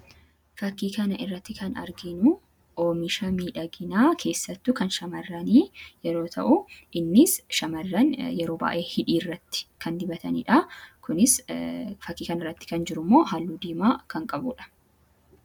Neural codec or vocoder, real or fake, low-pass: none; real; 19.8 kHz